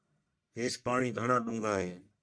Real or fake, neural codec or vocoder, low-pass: fake; codec, 44.1 kHz, 1.7 kbps, Pupu-Codec; 9.9 kHz